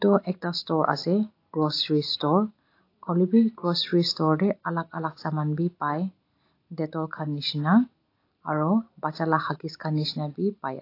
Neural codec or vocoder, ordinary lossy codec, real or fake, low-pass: none; AAC, 32 kbps; real; 5.4 kHz